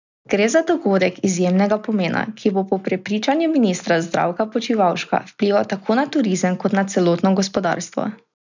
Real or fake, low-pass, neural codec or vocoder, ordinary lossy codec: real; 7.2 kHz; none; none